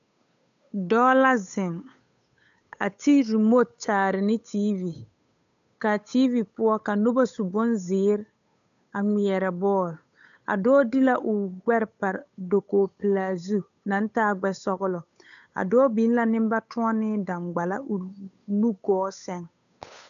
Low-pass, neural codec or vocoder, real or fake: 7.2 kHz; codec, 16 kHz, 8 kbps, FunCodec, trained on Chinese and English, 25 frames a second; fake